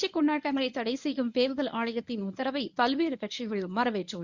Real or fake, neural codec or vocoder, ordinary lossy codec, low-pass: fake; codec, 24 kHz, 0.9 kbps, WavTokenizer, medium speech release version 1; none; 7.2 kHz